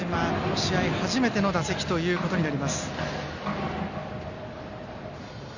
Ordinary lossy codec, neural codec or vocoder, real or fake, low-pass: none; none; real; 7.2 kHz